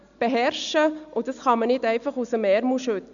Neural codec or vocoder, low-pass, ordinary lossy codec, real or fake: none; 7.2 kHz; none; real